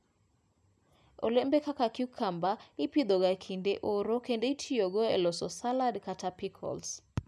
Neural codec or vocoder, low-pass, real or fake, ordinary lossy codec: none; none; real; none